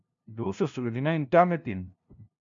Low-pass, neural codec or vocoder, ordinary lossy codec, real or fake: 7.2 kHz; codec, 16 kHz, 0.5 kbps, FunCodec, trained on LibriTTS, 25 frames a second; MP3, 64 kbps; fake